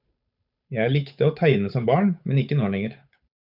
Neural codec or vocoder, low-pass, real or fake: codec, 16 kHz, 8 kbps, FunCodec, trained on Chinese and English, 25 frames a second; 5.4 kHz; fake